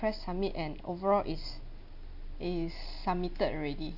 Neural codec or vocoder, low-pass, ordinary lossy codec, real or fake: none; 5.4 kHz; none; real